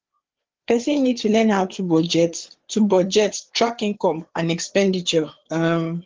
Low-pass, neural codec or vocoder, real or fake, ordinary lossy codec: 7.2 kHz; codec, 16 kHz, 4 kbps, FreqCodec, larger model; fake; Opus, 16 kbps